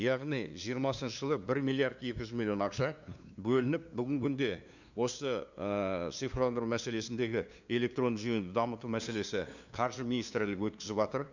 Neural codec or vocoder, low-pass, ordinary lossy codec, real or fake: codec, 16 kHz, 2 kbps, FunCodec, trained on LibriTTS, 25 frames a second; 7.2 kHz; none; fake